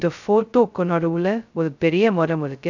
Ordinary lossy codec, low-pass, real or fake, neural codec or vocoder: none; 7.2 kHz; fake; codec, 16 kHz, 0.2 kbps, FocalCodec